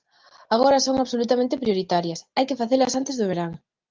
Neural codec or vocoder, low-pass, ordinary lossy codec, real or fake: none; 7.2 kHz; Opus, 32 kbps; real